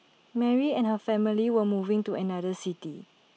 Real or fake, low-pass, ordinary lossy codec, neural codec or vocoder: real; none; none; none